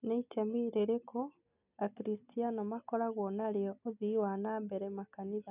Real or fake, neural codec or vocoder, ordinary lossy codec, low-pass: real; none; none; 3.6 kHz